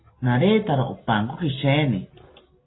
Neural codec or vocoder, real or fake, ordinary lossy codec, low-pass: none; real; AAC, 16 kbps; 7.2 kHz